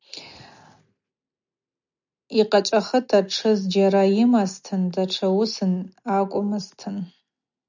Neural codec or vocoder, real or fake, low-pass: none; real; 7.2 kHz